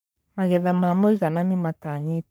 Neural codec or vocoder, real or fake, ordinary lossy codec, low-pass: codec, 44.1 kHz, 3.4 kbps, Pupu-Codec; fake; none; none